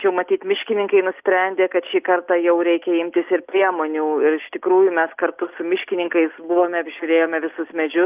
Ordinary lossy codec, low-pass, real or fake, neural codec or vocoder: Opus, 24 kbps; 3.6 kHz; real; none